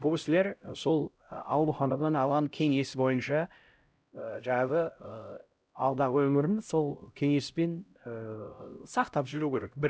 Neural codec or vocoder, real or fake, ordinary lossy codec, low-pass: codec, 16 kHz, 0.5 kbps, X-Codec, HuBERT features, trained on LibriSpeech; fake; none; none